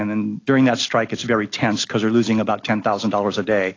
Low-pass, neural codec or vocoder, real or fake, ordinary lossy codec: 7.2 kHz; none; real; AAC, 32 kbps